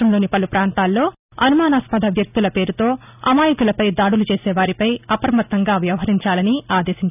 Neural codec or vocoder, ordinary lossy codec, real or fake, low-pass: none; none; real; 3.6 kHz